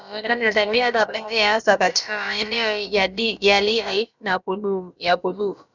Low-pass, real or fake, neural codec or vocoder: 7.2 kHz; fake; codec, 16 kHz, about 1 kbps, DyCAST, with the encoder's durations